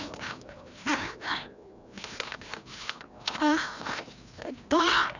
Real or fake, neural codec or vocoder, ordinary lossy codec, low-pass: fake; codec, 16 kHz, 1 kbps, FreqCodec, larger model; none; 7.2 kHz